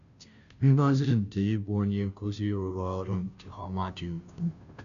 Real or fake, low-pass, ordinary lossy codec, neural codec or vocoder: fake; 7.2 kHz; none; codec, 16 kHz, 0.5 kbps, FunCodec, trained on Chinese and English, 25 frames a second